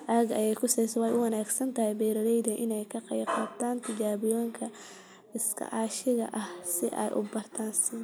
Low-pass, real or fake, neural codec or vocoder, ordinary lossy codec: none; real; none; none